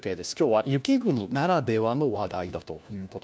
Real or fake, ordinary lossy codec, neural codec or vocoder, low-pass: fake; none; codec, 16 kHz, 1 kbps, FunCodec, trained on LibriTTS, 50 frames a second; none